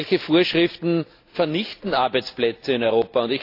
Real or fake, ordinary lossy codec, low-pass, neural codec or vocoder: real; AAC, 32 kbps; 5.4 kHz; none